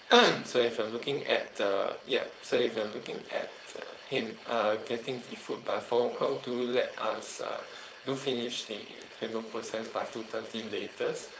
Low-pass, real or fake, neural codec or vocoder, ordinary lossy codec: none; fake; codec, 16 kHz, 4.8 kbps, FACodec; none